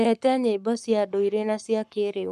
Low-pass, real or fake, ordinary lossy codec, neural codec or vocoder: 14.4 kHz; fake; none; codec, 44.1 kHz, 7.8 kbps, Pupu-Codec